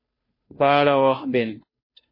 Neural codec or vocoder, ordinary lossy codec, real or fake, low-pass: codec, 16 kHz, 0.5 kbps, FunCodec, trained on Chinese and English, 25 frames a second; MP3, 24 kbps; fake; 5.4 kHz